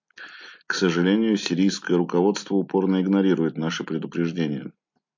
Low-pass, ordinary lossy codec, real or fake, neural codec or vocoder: 7.2 kHz; MP3, 48 kbps; real; none